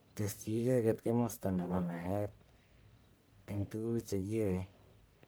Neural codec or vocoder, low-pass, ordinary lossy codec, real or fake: codec, 44.1 kHz, 1.7 kbps, Pupu-Codec; none; none; fake